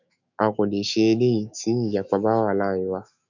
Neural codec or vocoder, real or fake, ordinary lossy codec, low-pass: autoencoder, 48 kHz, 128 numbers a frame, DAC-VAE, trained on Japanese speech; fake; none; 7.2 kHz